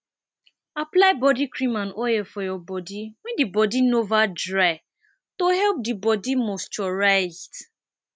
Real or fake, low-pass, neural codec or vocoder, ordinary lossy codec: real; none; none; none